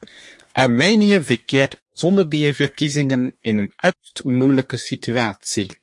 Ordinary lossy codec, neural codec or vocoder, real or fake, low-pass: MP3, 48 kbps; codec, 24 kHz, 1 kbps, SNAC; fake; 10.8 kHz